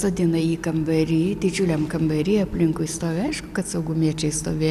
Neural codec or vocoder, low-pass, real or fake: none; 14.4 kHz; real